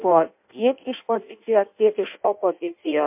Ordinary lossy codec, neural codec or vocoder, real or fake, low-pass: MP3, 32 kbps; codec, 16 kHz in and 24 kHz out, 0.6 kbps, FireRedTTS-2 codec; fake; 3.6 kHz